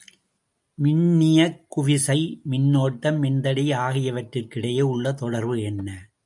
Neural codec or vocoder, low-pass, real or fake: none; 10.8 kHz; real